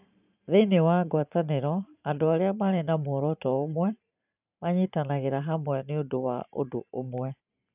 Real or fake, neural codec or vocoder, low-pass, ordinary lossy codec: fake; vocoder, 44.1 kHz, 128 mel bands every 512 samples, BigVGAN v2; 3.6 kHz; none